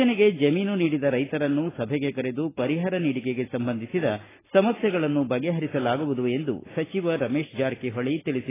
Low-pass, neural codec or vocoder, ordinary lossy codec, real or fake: 3.6 kHz; none; AAC, 16 kbps; real